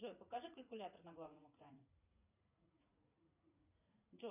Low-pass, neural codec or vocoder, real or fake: 3.6 kHz; vocoder, 44.1 kHz, 80 mel bands, Vocos; fake